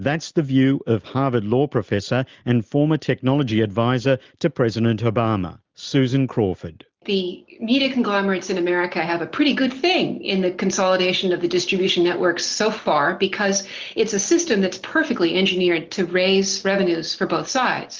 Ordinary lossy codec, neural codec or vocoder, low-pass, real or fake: Opus, 24 kbps; none; 7.2 kHz; real